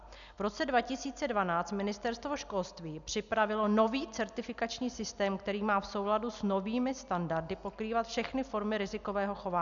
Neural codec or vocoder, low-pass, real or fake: none; 7.2 kHz; real